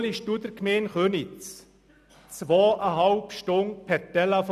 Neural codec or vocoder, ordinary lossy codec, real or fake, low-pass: none; none; real; 14.4 kHz